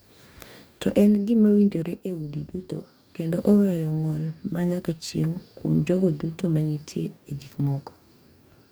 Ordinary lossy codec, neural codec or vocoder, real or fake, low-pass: none; codec, 44.1 kHz, 2.6 kbps, DAC; fake; none